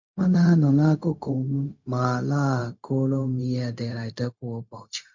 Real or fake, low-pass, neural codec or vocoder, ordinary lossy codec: fake; 7.2 kHz; codec, 16 kHz, 0.4 kbps, LongCat-Audio-Codec; MP3, 48 kbps